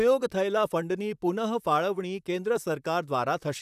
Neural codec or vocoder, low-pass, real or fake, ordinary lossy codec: vocoder, 44.1 kHz, 128 mel bands, Pupu-Vocoder; 14.4 kHz; fake; none